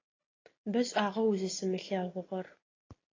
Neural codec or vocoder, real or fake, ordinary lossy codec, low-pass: none; real; AAC, 32 kbps; 7.2 kHz